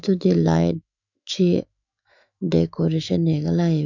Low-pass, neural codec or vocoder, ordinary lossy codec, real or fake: 7.2 kHz; autoencoder, 48 kHz, 128 numbers a frame, DAC-VAE, trained on Japanese speech; none; fake